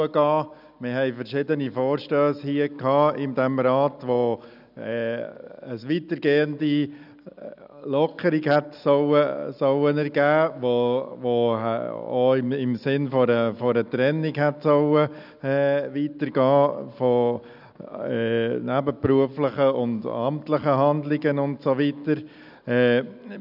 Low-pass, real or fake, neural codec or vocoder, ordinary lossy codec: 5.4 kHz; real; none; none